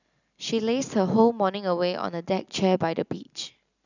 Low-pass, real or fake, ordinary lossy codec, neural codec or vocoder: 7.2 kHz; real; none; none